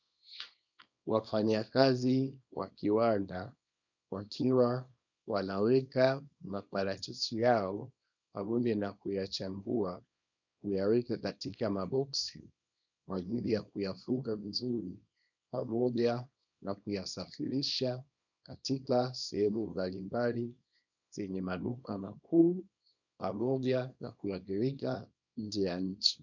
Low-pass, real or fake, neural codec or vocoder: 7.2 kHz; fake; codec, 24 kHz, 0.9 kbps, WavTokenizer, small release